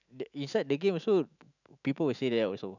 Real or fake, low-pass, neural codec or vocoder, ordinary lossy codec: fake; 7.2 kHz; autoencoder, 48 kHz, 128 numbers a frame, DAC-VAE, trained on Japanese speech; none